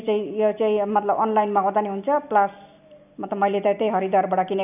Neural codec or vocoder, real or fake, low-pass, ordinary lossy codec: none; real; 3.6 kHz; none